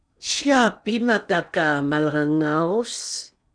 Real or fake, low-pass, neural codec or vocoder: fake; 9.9 kHz; codec, 16 kHz in and 24 kHz out, 0.8 kbps, FocalCodec, streaming, 65536 codes